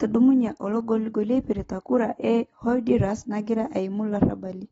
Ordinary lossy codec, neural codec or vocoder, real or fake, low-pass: AAC, 24 kbps; none; real; 19.8 kHz